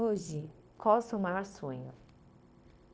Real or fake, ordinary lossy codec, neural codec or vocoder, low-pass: fake; none; codec, 16 kHz, 0.9 kbps, LongCat-Audio-Codec; none